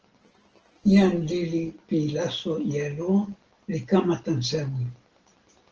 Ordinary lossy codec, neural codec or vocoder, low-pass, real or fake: Opus, 16 kbps; none; 7.2 kHz; real